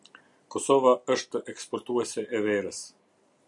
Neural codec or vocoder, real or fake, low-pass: none; real; 10.8 kHz